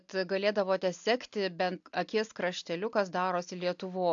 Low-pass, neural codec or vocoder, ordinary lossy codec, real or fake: 7.2 kHz; none; AAC, 64 kbps; real